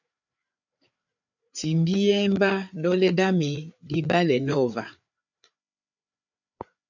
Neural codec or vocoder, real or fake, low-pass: codec, 16 kHz, 4 kbps, FreqCodec, larger model; fake; 7.2 kHz